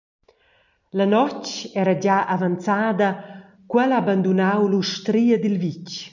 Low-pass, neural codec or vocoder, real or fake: 7.2 kHz; none; real